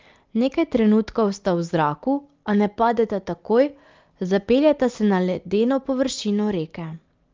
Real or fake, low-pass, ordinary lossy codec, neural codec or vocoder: real; 7.2 kHz; Opus, 24 kbps; none